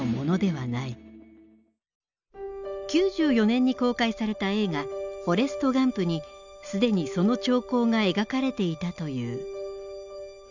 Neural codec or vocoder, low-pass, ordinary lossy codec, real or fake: none; 7.2 kHz; none; real